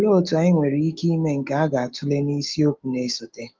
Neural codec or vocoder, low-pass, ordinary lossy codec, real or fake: autoencoder, 48 kHz, 128 numbers a frame, DAC-VAE, trained on Japanese speech; 7.2 kHz; Opus, 32 kbps; fake